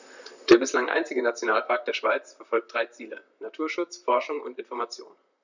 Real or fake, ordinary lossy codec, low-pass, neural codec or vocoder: fake; none; 7.2 kHz; vocoder, 44.1 kHz, 128 mel bands, Pupu-Vocoder